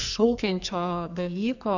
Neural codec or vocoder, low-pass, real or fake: codec, 44.1 kHz, 2.6 kbps, SNAC; 7.2 kHz; fake